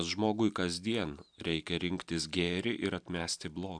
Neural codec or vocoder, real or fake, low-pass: none; real; 9.9 kHz